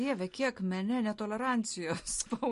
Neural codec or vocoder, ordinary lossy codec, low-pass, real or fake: none; MP3, 48 kbps; 14.4 kHz; real